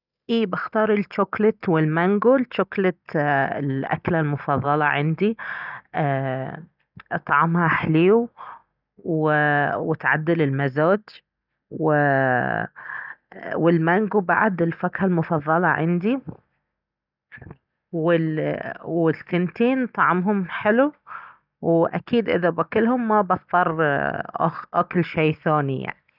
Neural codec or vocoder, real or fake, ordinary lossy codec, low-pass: none; real; none; 5.4 kHz